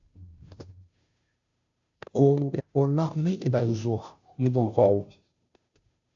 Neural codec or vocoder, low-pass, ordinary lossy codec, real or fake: codec, 16 kHz, 0.5 kbps, FunCodec, trained on Chinese and English, 25 frames a second; 7.2 kHz; AAC, 64 kbps; fake